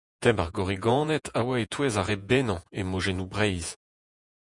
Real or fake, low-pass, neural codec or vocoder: fake; 10.8 kHz; vocoder, 48 kHz, 128 mel bands, Vocos